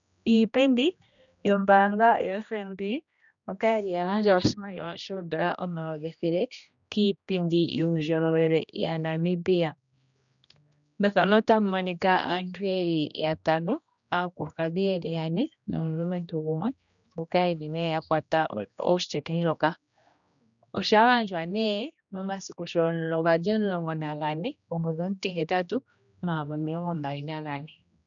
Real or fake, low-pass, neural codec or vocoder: fake; 7.2 kHz; codec, 16 kHz, 1 kbps, X-Codec, HuBERT features, trained on general audio